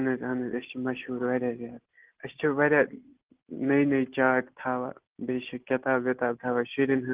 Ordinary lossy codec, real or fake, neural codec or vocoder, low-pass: Opus, 16 kbps; real; none; 3.6 kHz